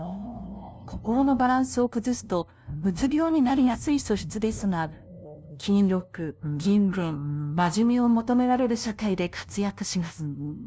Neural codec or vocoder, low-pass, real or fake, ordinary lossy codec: codec, 16 kHz, 0.5 kbps, FunCodec, trained on LibriTTS, 25 frames a second; none; fake; none